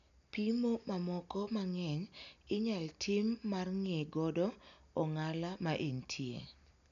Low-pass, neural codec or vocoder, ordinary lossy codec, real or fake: 7.2 kHz; none; none; real